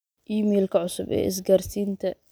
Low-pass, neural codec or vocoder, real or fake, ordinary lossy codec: none; none; real; none